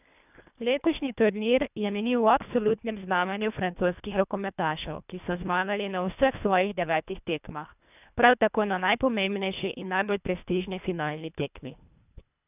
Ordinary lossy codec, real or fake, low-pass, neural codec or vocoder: none; fake; 3.6 kHz; codec, 24 kHz, 1.5 kbps, HILCodec